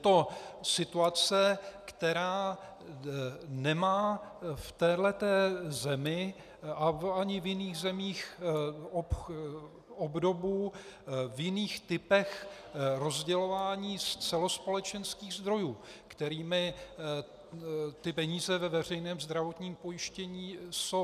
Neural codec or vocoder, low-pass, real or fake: none; 14.4 kHz; real